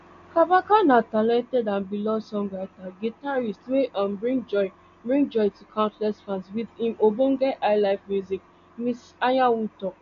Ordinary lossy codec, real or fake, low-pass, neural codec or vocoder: none; real; 7.2 kHz; none